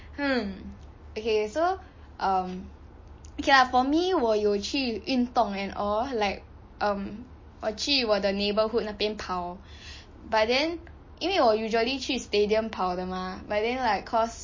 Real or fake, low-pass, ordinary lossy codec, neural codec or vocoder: real; 7.2 kHz; MP3, 32 kbps; none